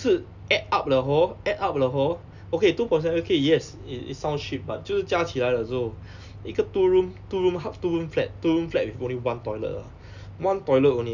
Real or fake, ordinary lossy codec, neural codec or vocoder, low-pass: real; none; none; 7.2 kHz